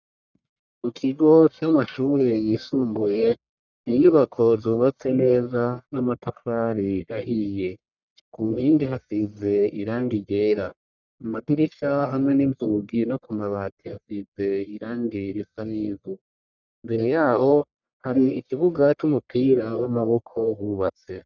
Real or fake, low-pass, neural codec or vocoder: fake; 7.2 kHz; codec, 44.1 kHz, 1.7 kbps, Pupu-Codec